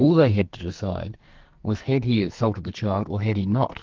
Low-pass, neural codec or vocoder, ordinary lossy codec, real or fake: 7.2 kHz; codec, 44.1 kHz, 2.6 kbps, SNAC; Opus, 32 kbps; fake